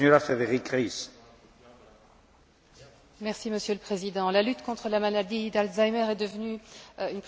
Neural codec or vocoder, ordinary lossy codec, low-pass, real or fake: none; none; none; real